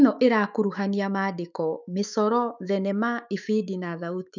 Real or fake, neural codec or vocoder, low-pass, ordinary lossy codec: fake; autoencoder, 48 kHz, 128 numbers a frame, DAC-VAE, trained on Japanese speech; 7.2 kHz; none